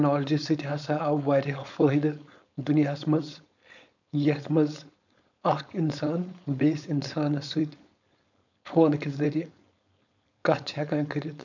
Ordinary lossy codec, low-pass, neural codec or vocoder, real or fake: none; 7.2 kHz; codec, 16 kHz, 4.8 kbps, FACodec; fake